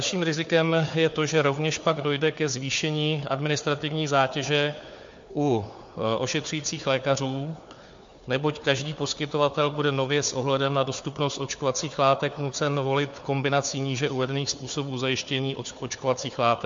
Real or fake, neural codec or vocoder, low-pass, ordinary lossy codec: fake; codec, 16 kHz, 4 kbps, FunCodec, trained on Chinese and English, 50 frames a second; 7.2 kHz; MP3, 48 kbps